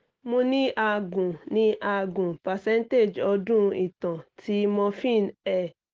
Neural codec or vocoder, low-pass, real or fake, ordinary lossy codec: none; 7.2 kHz; real; Opus, 16 kbps